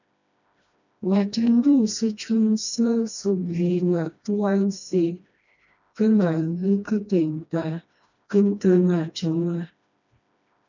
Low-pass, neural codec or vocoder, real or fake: 7.2 kHz; codec, 16 kHz, 1 kbps, FreqCodec, smaller model; fake